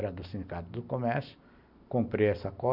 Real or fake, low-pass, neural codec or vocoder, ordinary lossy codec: real; 5.4 kHz; none; none